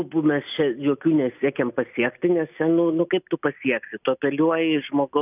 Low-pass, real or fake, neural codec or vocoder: 3.6 kHz; real; none